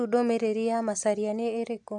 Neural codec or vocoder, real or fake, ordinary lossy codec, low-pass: none; real; AAC, 64 kbps; 10.8 kHz